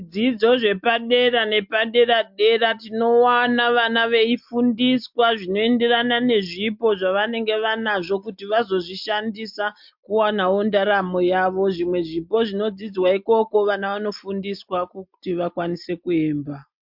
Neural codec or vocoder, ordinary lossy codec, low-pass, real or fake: none; MP3, 48 kbps; 5.4 kHz; real